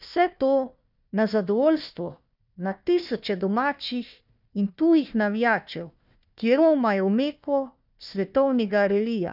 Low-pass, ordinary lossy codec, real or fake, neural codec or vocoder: 5.4 kHz; none; fake; codec, 16 kHz, 1 kbps, FunCodec, trained on Chinese and English, 50 frames a second